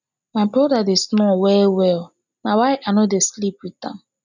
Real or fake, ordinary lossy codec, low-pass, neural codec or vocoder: real; none; 7.2 kHz; none